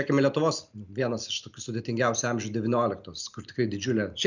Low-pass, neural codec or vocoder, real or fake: 7.2 kHz; none; real